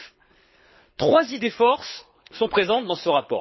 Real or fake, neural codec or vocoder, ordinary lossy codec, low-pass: fake; codec, 24 kHz, 6 kbps, HILCodec; MP3, 24 kbps; 7.2 kHz